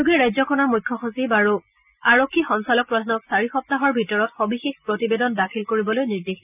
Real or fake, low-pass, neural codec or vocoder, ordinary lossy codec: real; 3.6 kHz; none; none